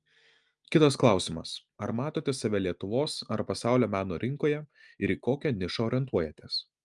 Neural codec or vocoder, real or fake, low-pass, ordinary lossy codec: none; real; 10.8 kHz; Opus, 32 kbps